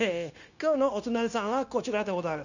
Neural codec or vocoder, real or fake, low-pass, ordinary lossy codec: codec, 16 kHz, 0.9 kbps, LongCat-Audio-Codec; fake; 7.2 kHz; none